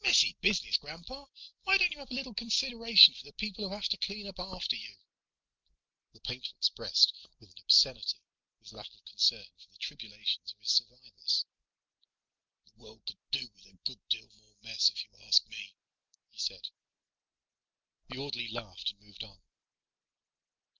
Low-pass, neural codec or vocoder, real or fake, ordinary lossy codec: 7.2 kHz; none; real; Opus, 32 kbps